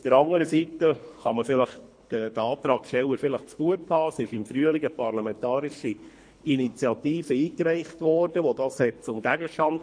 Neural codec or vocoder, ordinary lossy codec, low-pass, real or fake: codec, 24 kHz, 3 kbps, HILCodec; MP3, 48 kbps; 9.9 kHz; fake